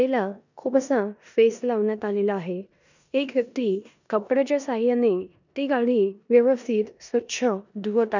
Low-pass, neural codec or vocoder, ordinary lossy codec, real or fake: 7.2 kHz; codec, 16 kHz in and 24 kHz out, 0.9 kbps, LongCat-Audio-Codec, four codebook decoder; none; fake